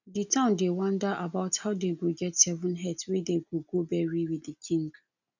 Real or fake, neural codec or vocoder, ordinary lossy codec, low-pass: real; none; none; 7.2 kHz